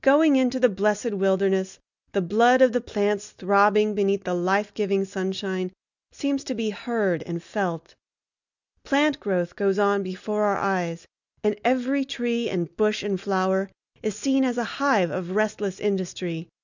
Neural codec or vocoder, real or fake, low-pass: none; real; 7.2 kHz